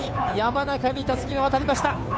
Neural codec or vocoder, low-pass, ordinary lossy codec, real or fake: codec, 16 kHz, 2 kbps, FunCodec, trained on Chinese and English, 25 frames a second; none; none; fake